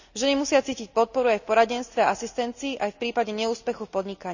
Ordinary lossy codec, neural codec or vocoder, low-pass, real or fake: none; none; 7.2 kHz; real